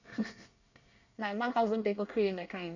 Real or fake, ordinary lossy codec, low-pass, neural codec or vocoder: fake; none; 7.2 kHz; codec, 24 kHz, 1 kbps, SNAC